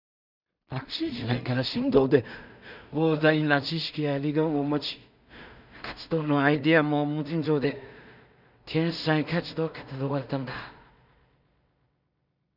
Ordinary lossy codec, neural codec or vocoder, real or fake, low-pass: none; codec, 16 kHz in and 24 kHz out, 0.4 kbps, LongCat-Audio-Codec, two codebook decoder; fake; 5.4 kHz